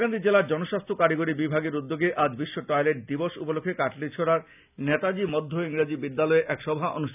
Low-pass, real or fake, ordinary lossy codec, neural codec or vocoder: 3.6 kHz; real; none; none